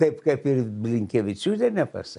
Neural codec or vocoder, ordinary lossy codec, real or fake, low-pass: none; AAC, 96 kbps; real; 10.8 kHz